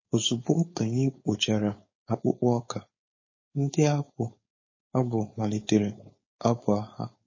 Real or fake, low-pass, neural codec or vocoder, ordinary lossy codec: fake; 7.2 kHz; codec, 16 kHz, 4.8 kbps, FACodec; MP3, 32 kbps